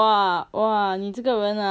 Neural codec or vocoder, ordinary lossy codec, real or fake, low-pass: none; none; real; none